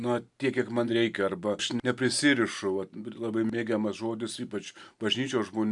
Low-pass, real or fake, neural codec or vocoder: 10.8 kHz; real; none